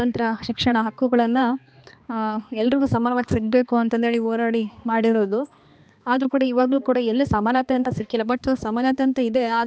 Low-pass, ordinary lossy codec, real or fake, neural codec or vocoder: none; none; fake; codec, 16 kHz, 2 kbps, X-Codec, HuBERT features, trained on balanced general audio